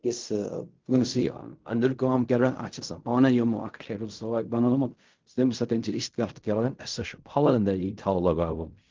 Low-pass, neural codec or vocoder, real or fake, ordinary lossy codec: 7.2 kHz; codec, 16 kHz in and 24 kHz out, 0.4 kbps, LongCat-Audio-Codec, fine tuned four codebook decoder; fake; Opus, 24 kbps